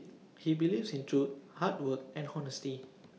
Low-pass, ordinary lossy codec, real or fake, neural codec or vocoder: none; none; real; none